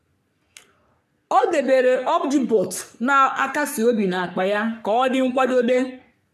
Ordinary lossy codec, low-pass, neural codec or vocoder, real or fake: none; 14.4 kHz; codec, 44.1 kHz, 3.4 kbps, Pupu-Codec; fake